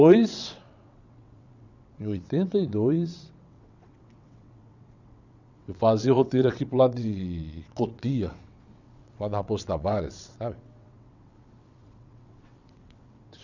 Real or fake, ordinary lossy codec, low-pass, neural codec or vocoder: fake; none; 7.2 kHz; vocoder, 22.05 kHz, 80 mel bands, WaveNeXt